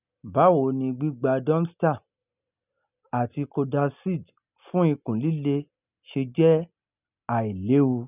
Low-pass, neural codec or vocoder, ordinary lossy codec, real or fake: 3.6 kHz; none; none; real